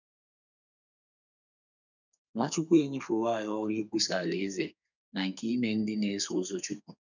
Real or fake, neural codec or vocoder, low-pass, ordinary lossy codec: fake; codec, 32 kHz, 1.9 kbps, SNAC; 7.2 kHz; none